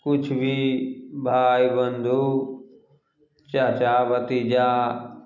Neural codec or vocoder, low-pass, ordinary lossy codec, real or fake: none; 7.2 kHz; none; real